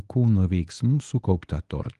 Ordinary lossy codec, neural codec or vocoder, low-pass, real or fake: Opus, 24 kbps; codec, 24 kHz, 0.9 kbps, WavTokenizer, medium speech release version 1; 10.8 kHz; fake